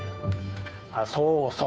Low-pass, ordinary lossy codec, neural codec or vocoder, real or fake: none; none; codec, 16 kHz, 2 kbps, FunCodec, trained on Chinese and English, 25 frames a second; fake